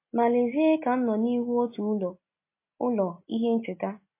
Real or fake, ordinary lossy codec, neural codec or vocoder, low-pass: real; MP3, 24 kbps; none; 3.6 kHz